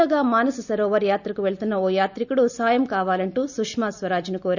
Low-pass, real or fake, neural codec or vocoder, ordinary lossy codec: 7.2 kHz; real; none; none